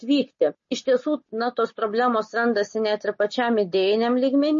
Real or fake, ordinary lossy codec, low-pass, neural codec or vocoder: real; MP3, 32 kbps; 7.2 kHz; none